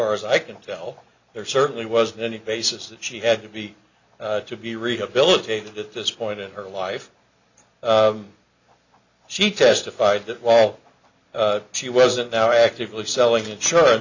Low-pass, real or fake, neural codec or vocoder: 7.2 kHz; real; none